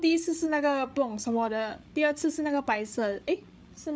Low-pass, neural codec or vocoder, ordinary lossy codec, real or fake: none; codec, 16 kHz, 8 kbps, FreqCodec, larger model; none; fake